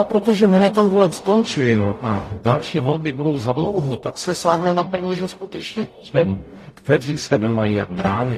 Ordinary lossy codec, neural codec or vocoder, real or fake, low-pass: AAC, 48 kbps; codec, 44.1 kHz, 0.9 kbps, DAC; fake; 14.4 kHz